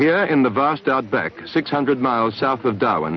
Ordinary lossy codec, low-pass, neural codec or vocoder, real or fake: MP3, 64 kbps; 7.2 kHz; none; real